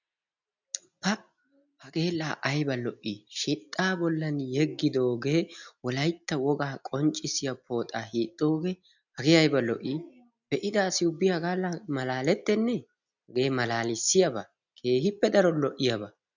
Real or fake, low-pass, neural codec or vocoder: real; 7.2 kHz; none